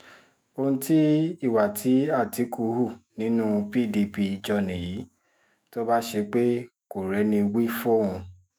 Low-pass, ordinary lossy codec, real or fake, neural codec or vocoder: none; none; fake; autoencoder, 48 kHz, 128 numbers a frame, DAC-VAE, trained on Japanese speech